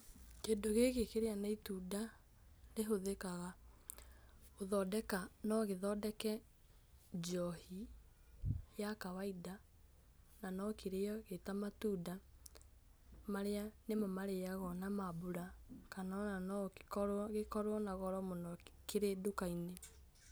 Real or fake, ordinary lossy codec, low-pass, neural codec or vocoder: real; none; none; none